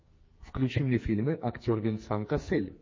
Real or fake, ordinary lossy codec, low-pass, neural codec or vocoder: fake; MP3, 32 kbps; 7.2 kHz; codec, 44.1 kHz, 2.6 kbps, SNAC